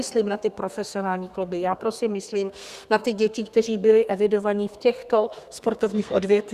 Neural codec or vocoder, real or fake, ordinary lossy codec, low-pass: codec, 32 kHz, 1.9 kbps, SNAC; fake; Opus, 64 kbps; 14.4 kHz